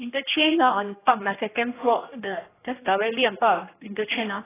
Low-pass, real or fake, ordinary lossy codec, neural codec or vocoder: 3.6 kHz; fake; AAC, 16 kbps; codec, 16 kHz, 1 kbps, X-Codec, HuBERT features, trained on general audio